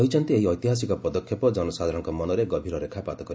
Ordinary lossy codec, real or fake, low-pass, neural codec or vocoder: none; real; none; none